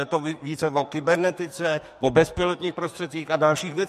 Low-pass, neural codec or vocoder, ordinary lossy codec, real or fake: 14.4 kHz; codec, 44.1 kHz, 2.6 kbps, SNAC; MP3, 64 kbps; fake